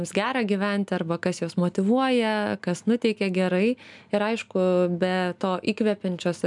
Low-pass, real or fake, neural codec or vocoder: 10.8 kHz; real; none